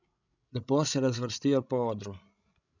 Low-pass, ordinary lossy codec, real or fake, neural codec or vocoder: 7.2 kHz; none; fake; codec, 16 kHz, 16 kbps, FreqCodec, larger model